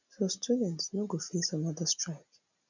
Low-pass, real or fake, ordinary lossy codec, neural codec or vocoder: 7.2 kHz; real; none; none